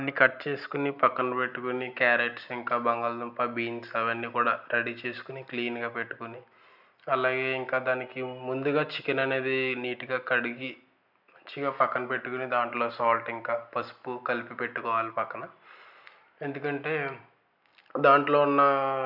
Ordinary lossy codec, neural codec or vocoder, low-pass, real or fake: none; none; 5.4 kHz; real